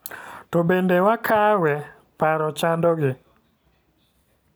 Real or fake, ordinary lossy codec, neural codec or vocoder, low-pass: real; none; none; none